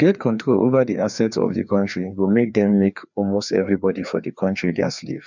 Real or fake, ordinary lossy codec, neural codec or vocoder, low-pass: fake; none; codec, 16 kHz, 2 kbps, FreqCodec, larger model; 7.2 kHz